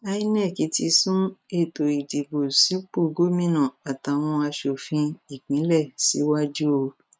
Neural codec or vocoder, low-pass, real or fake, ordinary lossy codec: none; none; real; none